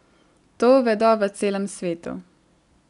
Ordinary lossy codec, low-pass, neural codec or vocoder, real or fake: none; 10.8 kHz; none; real